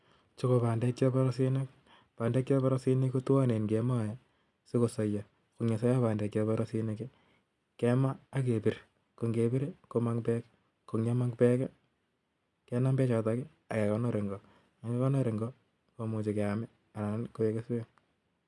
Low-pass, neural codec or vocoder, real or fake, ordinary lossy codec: none; none; real; none